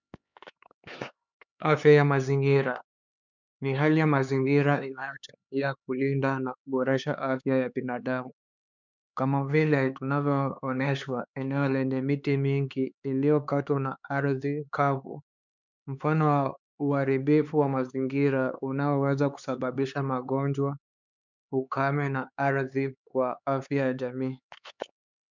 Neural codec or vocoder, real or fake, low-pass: codec, 16 kHz, 4 kbps, X-Codec, HuBERT features, trained on LibriSpeech; fake; 7.2 kHz